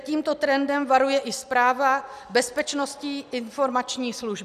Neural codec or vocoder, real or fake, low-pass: none; real; 14.4 kHz